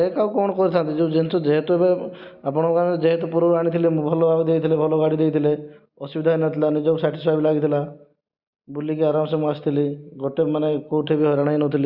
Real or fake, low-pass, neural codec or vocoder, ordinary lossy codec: real; 5.4 kHz; none; Opus, 64 kbps